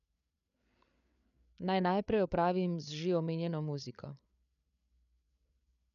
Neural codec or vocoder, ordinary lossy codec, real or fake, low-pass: codec, 16 kHz, 8 kbps, FreqCodec, larger model; none; fake; 7.2 kHz